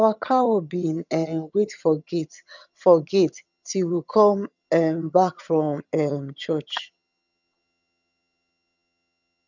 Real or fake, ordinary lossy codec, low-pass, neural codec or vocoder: fake; none; 7.2 kHz; vocoder, 22.05 kHz, 80 mel bands, HiFi-GAN